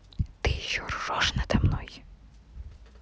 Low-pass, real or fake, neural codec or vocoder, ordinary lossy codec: none; real; none; none